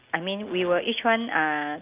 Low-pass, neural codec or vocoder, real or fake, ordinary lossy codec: 3.6 kHz; none; real; Opus, 24 kbps